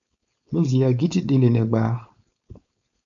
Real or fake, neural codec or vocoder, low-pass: fake; codec, 16 kHz, 4.8 kbps, FACodec; 7.2 kHz